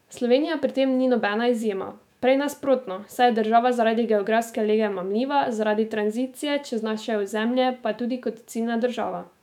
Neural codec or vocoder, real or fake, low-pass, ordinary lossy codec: autoencoder, 48 kHz, 128 numbers a frame, DAC-VAE, trained on Japanese speech; fake; 19.8 kHz; none